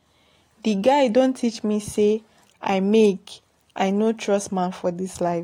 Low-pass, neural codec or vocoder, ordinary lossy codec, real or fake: 19.8 kHz; none; AAC, 48 kbps; real